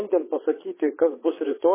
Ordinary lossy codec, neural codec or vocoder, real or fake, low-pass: MP3, 16 kbps; vocoder, 22.05 kHz, 80 mel bands, Vocos; fake; 3.6 kHz